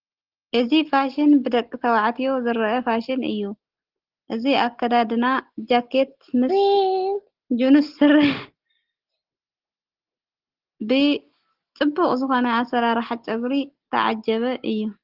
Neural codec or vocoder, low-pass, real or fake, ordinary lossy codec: none; 5.4 kHz; real; Opus, 16 kbps